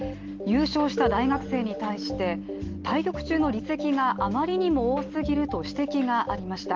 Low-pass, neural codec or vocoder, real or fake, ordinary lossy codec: 7.2 kHz; none; real; Opus, 16 kbps